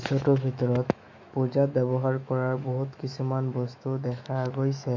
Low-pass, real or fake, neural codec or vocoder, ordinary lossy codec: 7.2 kHz; fake; autoencoder, 48 kHz, 128 numbers a frame, DAC-VAE, trained on Japanese speech; MP3, 48 kbps